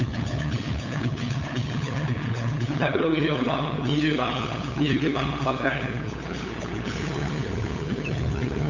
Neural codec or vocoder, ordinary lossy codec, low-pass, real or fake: codec, 16 kHz, 8 kbps, FunCodec, trained on LibriTTS, 25 frames a second; none; 7.2 kHz; fake